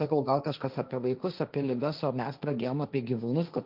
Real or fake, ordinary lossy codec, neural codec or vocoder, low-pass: fake; Opus, 24 kbps; codec, 16 kHz, 1.1 kbps, Voila-Tokenizer; 5.4 kHz